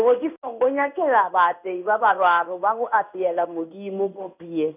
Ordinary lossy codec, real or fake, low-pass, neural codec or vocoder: none; fake; 3.6 kHz; codec, 16 kHz in and 24 kHz out, 1 kbps, XY-Tokenizer